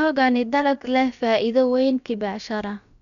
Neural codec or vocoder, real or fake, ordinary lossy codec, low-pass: codec, 16 kHz, about 1 kbps, DyCAST, with the encoder's durations; fake; none; 7.2 kHz